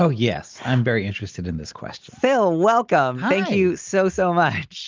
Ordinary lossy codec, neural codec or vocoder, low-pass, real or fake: Opus, 32 kbps; none; 7.2 kHz; real